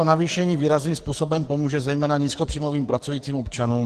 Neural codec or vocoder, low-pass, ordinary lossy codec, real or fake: codec, 44.1 kHz, 2.6 kbps, SNAC; 14.4 kHz; Opus, 16 kbps; fake